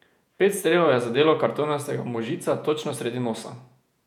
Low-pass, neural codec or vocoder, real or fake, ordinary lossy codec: 19.8 kHz; vocoder, 48 kHz, 128 mel bands, Vocos; fake; none